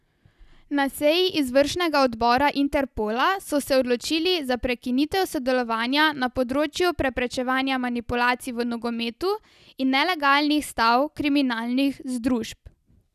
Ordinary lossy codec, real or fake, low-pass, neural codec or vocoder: none; real; 14.4 kHz; none